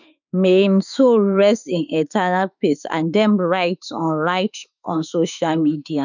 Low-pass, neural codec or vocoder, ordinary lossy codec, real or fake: 7.2 kHz; autoencoder, 48 kHz, 32 numbers a frame, DAC-VAE, trained on Japanese speech; none; fake